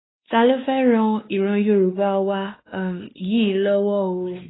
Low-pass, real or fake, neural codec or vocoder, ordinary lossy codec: 7.2 kHz; fake; codec, 16 kHz, 2 kbps, X-Codec, WavLM features, trained on Multilingual LibriSpeech; AAC, 16 kbps